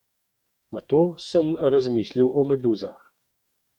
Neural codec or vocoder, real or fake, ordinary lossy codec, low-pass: codec, 44.1 kHz, 2.6 kbps, DAC; fake; MP3, 96 kbps; 19.8 kHz